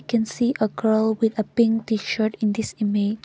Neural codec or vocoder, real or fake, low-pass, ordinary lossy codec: none; real; none; none